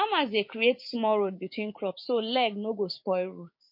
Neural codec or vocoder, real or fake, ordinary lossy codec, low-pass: codec, 16 kHz, 6 kbps, DAC; fake; MP3, 32 kbps; 5.4 kHz